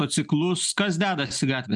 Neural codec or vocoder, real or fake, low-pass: none; real; 10.8 kHz